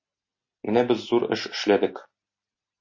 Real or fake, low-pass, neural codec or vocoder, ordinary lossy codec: real; 7.2 kHz; none; MP3, 32 kbps